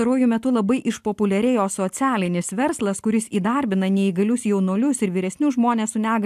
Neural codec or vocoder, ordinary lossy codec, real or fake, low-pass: none; AAC, 96 kbps; real; 14.4 kHz